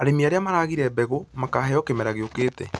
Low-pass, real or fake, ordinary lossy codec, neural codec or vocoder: none; real; none; none